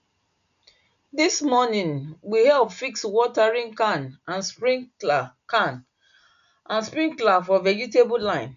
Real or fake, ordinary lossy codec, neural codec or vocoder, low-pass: real; none; none; 7.2 kHz